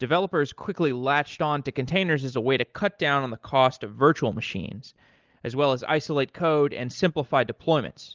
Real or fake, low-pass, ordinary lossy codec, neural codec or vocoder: real; 7.2 kHz; Opus, 32 kbps; none